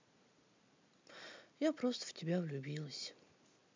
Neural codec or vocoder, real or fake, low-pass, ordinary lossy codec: none; real; 7.2 kHz; MP3, 64 kbps